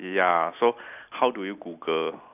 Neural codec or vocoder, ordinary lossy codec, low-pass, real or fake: none; none; 3.6 kHz; real